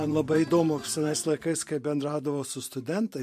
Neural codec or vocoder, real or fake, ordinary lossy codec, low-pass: vocoder, 44.1 kHz, 128 mel bands every 512 samples, BigVGAN v2; fake; MP3, 64 kbps; 14.4 kHz